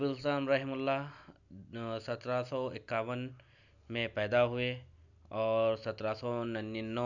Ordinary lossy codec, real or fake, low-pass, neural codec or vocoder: none; real; 7.2 kHz; none